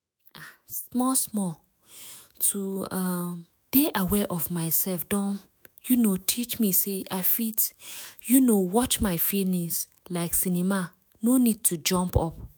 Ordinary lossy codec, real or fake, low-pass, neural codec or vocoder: none; fake; none; autoencoder, 48 kHz, 128 numbers a frame, DAC-VAE, trained on Japanese speech